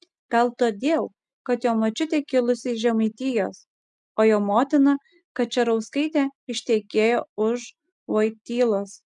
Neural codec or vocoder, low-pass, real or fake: none; 10.8 kHz; real